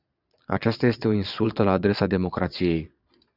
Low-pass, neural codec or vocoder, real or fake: 5.4 kHz; vocoder, 22.05 kHz, 80 mel bands, Vocos; fake